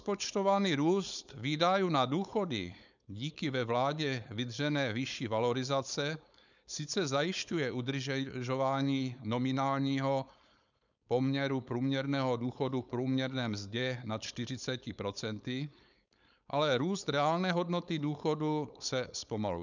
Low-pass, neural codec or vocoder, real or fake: 7.2 kHz; codec, 16 kHz, 4.8 kbps, FACodec; fake